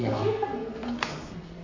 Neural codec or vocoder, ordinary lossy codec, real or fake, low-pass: codec, 32 kHz, 1.9 kbps, SNAC; AAC, 32 kbps; fake; 7.2 kHz